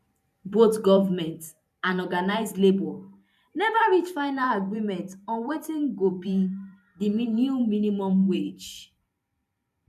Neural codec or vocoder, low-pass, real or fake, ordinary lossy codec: vocoder, 44.1 kHz, 128 mel bands every 512 samples, BigVGAN v2; 14.4 kHz; fake; none